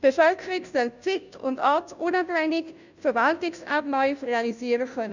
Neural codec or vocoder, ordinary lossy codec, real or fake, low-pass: codec, 16 kHz, 0.5 kbps, FunCodec, trained on Chinese and English, 25 frames a second; none; fake; 7.2 kHz